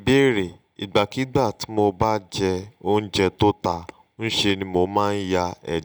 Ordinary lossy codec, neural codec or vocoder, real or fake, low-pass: none; none; real; none